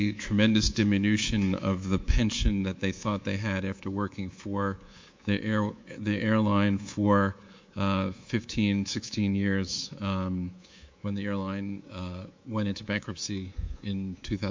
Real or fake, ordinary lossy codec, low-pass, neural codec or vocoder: fake; MP3, 48 kbps; 7.2 kHz; codec, 24 kHz, 3.1 kbps, DualCodec